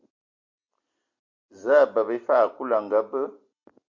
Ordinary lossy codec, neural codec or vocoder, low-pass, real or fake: MP3, 48 kbps; none; 7.2 kHz; real